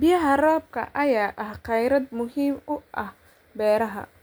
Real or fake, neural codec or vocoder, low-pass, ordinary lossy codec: real; none; none; none